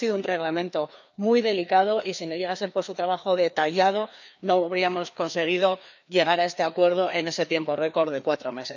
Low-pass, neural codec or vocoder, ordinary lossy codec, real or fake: 7.2 kHz; codec, 16 kHz, 2 kbps, FreqCodec, larger model; none; fake